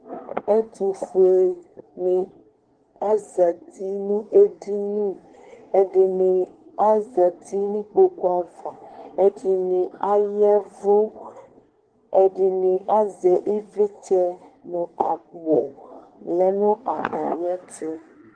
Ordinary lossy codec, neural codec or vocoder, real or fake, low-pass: Opus, 16 kbps; codec, 24 kHz, 1 kbps, SNAC; fake; 9.9 kHz